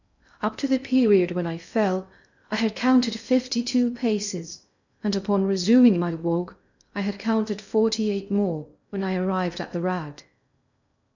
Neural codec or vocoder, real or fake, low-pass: codec, 16 kHz in and 24 kHz out, 0.6 kbps, FocalCodec, streaming, 2048 codes; fake; 7.2 kHz